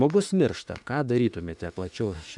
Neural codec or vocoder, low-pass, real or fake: autoencoder, 48 kHz, 32 numbers a frame, DAC-VAE, trained on Japanese speech; 10.8 kHz; fake